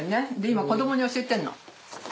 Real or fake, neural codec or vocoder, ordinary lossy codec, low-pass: real; none; none; none